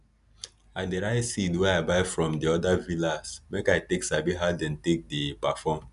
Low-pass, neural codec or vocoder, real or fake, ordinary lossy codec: 10.8 kHz; none; real; none